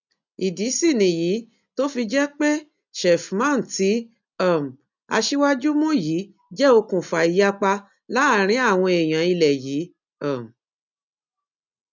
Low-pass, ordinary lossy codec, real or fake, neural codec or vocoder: 7.2 kHz; none; real; none